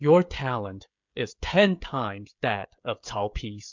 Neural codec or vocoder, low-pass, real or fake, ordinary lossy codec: codec, 16 kHz, 16 kbps, FreqCodec, smaller model; 7.2 kHz; fake; MP3, 64 kbps